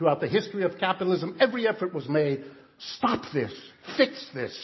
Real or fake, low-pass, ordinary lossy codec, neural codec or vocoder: real; 7.2 kHz; MP3, 24 kbps; none